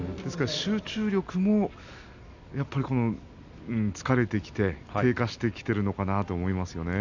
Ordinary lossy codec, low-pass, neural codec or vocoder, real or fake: none; 7.2 kHz; none; real